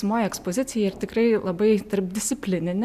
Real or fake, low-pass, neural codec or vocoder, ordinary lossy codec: real; 14.4 kHz; none; AAC, 96 kbps